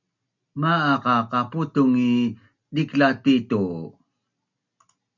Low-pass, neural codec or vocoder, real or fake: 7.2 kHz; none; real